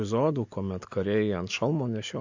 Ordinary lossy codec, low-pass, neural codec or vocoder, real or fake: MP3, 48 kbps; 7.2 kHz; none; real